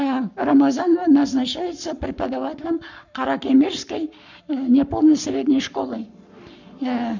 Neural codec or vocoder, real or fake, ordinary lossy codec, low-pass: codec, 44.1 kHz, 7.8 kbps, Pupu-Codec; fake; none; 7.2 kHz